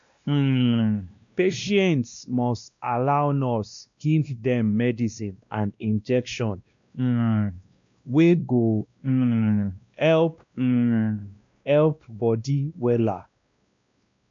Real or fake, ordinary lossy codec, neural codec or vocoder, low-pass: fake; MP3, 64 kbps; codec, 16 kHz, 1 kbps, X-Codec, WavLM features, trained on Multilingual LibriSpeech; 7.2 kHz